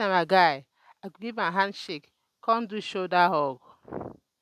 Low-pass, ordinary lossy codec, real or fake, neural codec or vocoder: 14.4 kHz; none; real; none